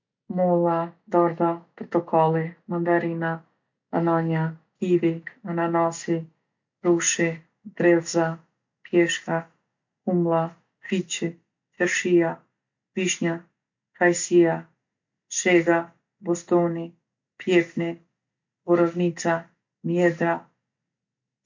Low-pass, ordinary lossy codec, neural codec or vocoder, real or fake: 7.2 kHz; MP3, 48 kbps; none; real